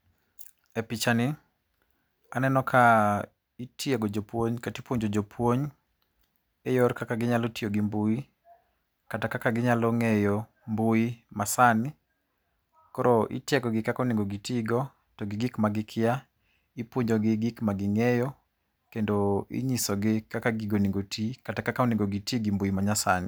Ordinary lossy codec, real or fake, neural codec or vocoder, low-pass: none; real; none; none